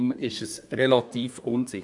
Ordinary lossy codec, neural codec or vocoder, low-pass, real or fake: none; codec, 24 kHz, 1 kbps, SNAC; 10.8 kHz; fake